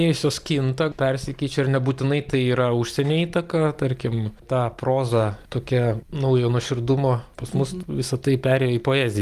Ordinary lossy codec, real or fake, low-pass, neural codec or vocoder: Opus, 32 kbps; real; 14.4 kHz; none